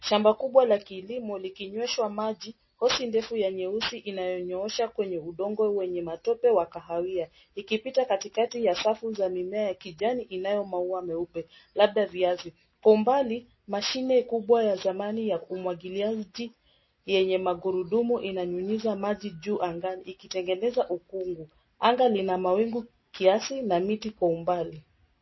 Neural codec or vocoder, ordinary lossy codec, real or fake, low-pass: none; MP3, 24 kbps; real; 7.2 kHz